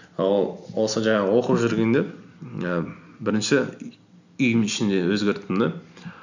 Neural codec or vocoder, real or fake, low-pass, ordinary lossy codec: none; real; 7.2 kHz; none